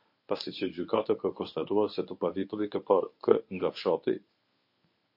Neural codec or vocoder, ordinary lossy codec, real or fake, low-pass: codec, 24 kHz, 0.9 kbps, WavTokenizer, medium speech release version 1; MP3, 32 kbps; fake; 5.4 kHz